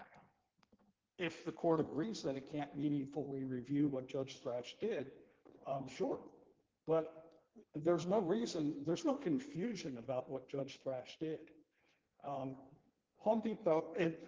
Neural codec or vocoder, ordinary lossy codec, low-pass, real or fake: codec, 16 kHz in and 24 kHz out, 1.1 kbps, FireRedTTS-2 codec; Opus, 16 kbps; 7.2 kHz; fake